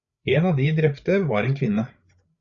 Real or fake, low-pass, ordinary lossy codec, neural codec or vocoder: fake; 7.2 kHz; Opus, 64 kbps; codec, 16 kHz, 8 kbps, FreqCodec, larger model